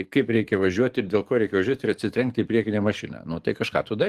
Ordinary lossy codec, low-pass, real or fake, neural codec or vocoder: Opus, 24 kbps; 14.4 kHz; fake; codec, 44.1 kHz, 7.8 kbps, DAC